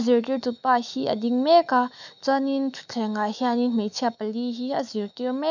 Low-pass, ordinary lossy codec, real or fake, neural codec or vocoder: 7.2 kHz; none; fake; autoencoder, 48 kHz, 128 numbers a frame, DAC-VAE, trained on Japanese speech